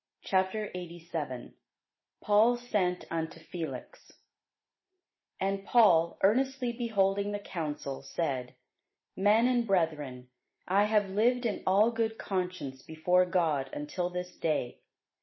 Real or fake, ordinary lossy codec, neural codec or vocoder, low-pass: real; MP3, 24 kbps; none; 7.2 kHz